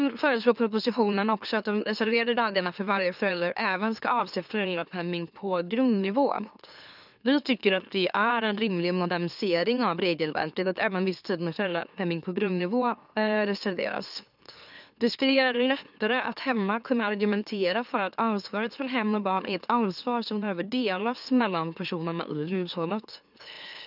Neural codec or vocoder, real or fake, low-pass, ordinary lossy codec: autoencoder, 44.1 kHz, a latent of 192 numbers a frame, MeloTTS; fake; 5.4 kHz; none